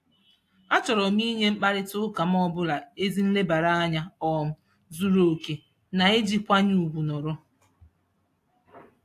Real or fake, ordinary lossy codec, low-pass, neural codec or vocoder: real; AAC, 64 kbps; 14.4 kHz; none